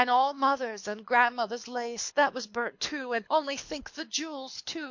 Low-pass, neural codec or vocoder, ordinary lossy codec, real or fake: 7.2 kHz; codec, 24 kHz, 6 kbps, HILCodec; MP3, 48 kbps; fake